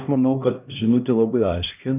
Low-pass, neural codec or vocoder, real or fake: 3.6 kHz; codec, 16 kHz, 1 kbps, X-Codec, HuBERT features, trained on LibriSpeech; fake